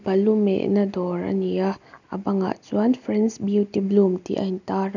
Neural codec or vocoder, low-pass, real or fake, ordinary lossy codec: none; 7.2 kHz; real; none